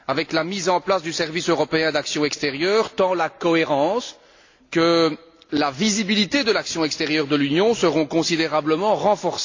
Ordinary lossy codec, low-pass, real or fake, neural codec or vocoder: AAC, 48 kbps; 7.2 kHz; real; none